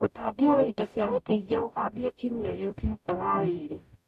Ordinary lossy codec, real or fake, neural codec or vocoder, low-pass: none; fake; codec, 44.1 kHz, 0.9 kbps, DAC; 14.4 kHz